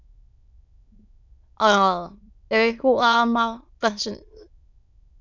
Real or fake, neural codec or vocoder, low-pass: fake; autoencoder, 22.05 kHz, a latent of 192 numbers a frame, VITS, trained on many speakers; 7.2 kHz